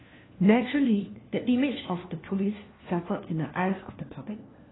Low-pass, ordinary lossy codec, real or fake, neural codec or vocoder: 7.2 kHz; AAC, 16 kbps; fake; codec, 16 kHz, 1 kbps, FunCodec, trained on LibriTTS, 50 frames a second